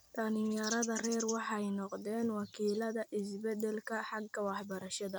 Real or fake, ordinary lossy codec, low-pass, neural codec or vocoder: fake; none; none; vocoder, 44.1 kHz, 128 mel bands every 256 samples, BigVGAN v2